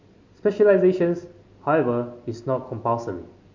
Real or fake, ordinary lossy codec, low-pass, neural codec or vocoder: real; MP3, 48 kbps; 7.2 kHz; none